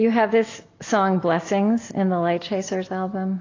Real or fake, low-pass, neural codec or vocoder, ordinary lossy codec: real; 7.2 kHz; none; AAC, 32 kbps